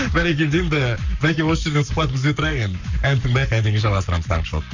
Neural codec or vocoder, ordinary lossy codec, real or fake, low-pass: codec, 44.1 kHz, 7.8 kbps, Pupu-Codec; none; fake; 7.2 kHz